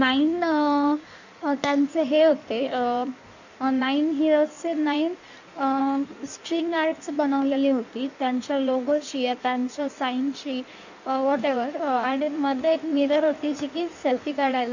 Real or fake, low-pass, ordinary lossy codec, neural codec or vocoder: fake; 7.2 kHz; none; codec, 16 kHz in and 24 kHz out, 1.1 kbps, FireRedTTS-2 codec